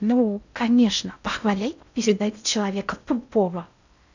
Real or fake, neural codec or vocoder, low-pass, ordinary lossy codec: fake; codec, 16 kHz in and 24 kHz out, 0.6 kbps, FocalCodec, streaming, 2048 codes; 7.2 kHz; none